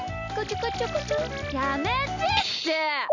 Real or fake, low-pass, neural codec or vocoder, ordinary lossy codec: real; 7.2 kHz; none; none